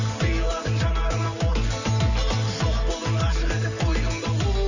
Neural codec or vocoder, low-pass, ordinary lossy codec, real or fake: none; 7.2 kHz; none; real